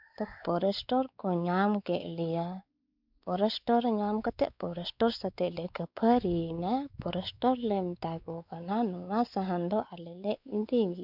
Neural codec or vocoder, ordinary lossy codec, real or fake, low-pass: codec, 16 kHz, 4 kbps, X-Codec, WavLM features, trained on Multilingual LibriSpeech; none; fake; 5.4 kHz